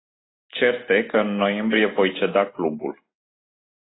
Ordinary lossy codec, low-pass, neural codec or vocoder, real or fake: AAC, 16 kbps; 7.2 kHz; none; real